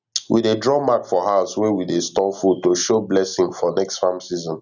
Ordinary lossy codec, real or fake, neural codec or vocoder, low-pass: none; real; none; 7.2 kHz